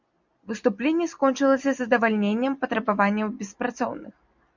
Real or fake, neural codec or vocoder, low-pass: real; none; 7.2 kHz